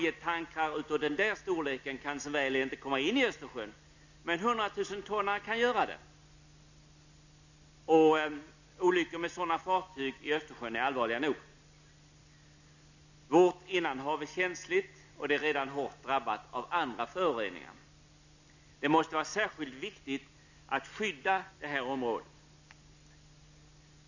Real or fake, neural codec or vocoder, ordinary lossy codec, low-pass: real; none; none; 7.2 kHz